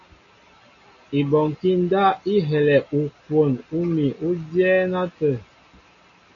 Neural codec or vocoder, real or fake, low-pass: none; real; 7.2 kHz